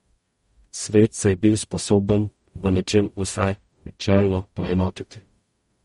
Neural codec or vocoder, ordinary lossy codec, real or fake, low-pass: codec, 44.1 kHz, 0.9 kbps, DAC; MP3, 48 kbps; fake; 19.8 kHz